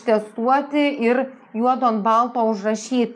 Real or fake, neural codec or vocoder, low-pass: real; none; 9.9 kHz